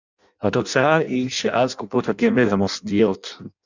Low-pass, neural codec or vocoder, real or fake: 7.2 kHz; codec, 16 kHz in and 24 kHz out, 0.6 kbps, FireRedTTS-2 codec; fake